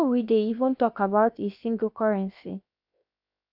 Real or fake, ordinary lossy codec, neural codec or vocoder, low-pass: fake; AAC, 48 kbps; codec, 16 kHz, 0.7 kbps, FocalCodec; 5.4 kHz